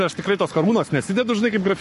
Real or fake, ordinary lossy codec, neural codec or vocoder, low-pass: fake; MP3, 48 kbps; codec, 44.1 kHz, 7.8 kbps, Pupu-Codec; 14.4 kHz